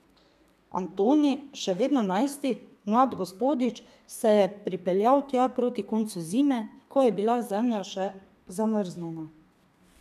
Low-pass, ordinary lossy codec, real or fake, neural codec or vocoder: 14.4 kHz; none; fake; codec, 32 kHz, 1.9 kbps, SNAC